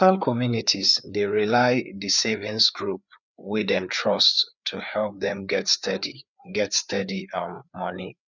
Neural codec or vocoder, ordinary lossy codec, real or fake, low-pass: codec, 16 kHz, 4 kbps, FreqCodec, larger model; none; fake; 7.2 kHz